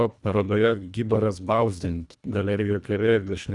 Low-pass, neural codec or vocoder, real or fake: 10.8 kHz; codec, 24 kHz, 1.5 kbps, HILCodec; fake